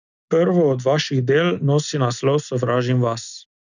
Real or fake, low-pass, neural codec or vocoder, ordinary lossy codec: real; 7.2 kHz; none; none